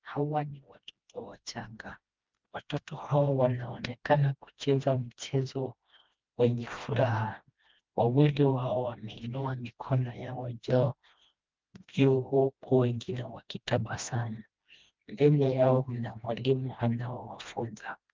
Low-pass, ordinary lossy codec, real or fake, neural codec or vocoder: 7.2 kHz; Opus, 32 kbps; fake; codec, 16 kHz, 1 kbps, FreqCodec, smaller model